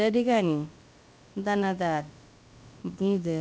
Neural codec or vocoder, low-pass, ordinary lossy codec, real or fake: codec, 16 kHz, about 1 kbps, DyCAST, with the encoder's durations; none; none; fake